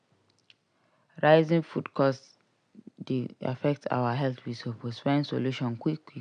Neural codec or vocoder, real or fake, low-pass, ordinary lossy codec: none; real; 9.9 kHz; none